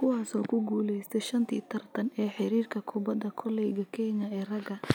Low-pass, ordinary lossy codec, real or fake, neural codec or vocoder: none; none; real; none